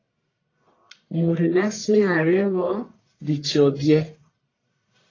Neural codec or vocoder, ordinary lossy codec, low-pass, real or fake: codec, 44.1 kHz, 1.7 kbps, Pupu-Codec; AAC, 32 kbps; 7.2 kHz; fake